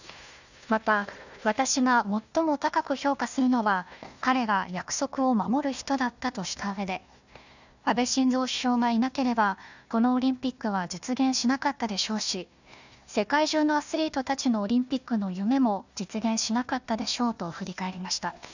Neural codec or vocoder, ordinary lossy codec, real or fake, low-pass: codec, 16 kHz, 1 kbps, FunCodec, trained on Chinese and English, 50 frames a second; none; fake; 7.2 kHz